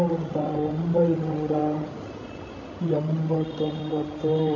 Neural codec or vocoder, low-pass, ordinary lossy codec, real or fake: codec, 16 kHz, 16 kbps, FreqCodec, larger model; 7.2 kHz; none; fake